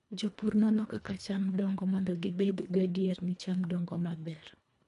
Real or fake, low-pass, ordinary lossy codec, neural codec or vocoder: fake; 10.8 kHz; none; codec, 24 kHz, 1.5 kbps, HILCodec